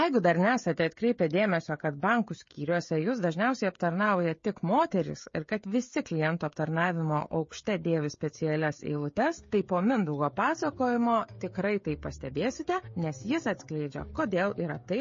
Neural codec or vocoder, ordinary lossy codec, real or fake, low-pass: codec, 16 kHz, 16 kbps, FreqCodec, smaller model; MP3, 32 kbps; fake; 7.2 kHz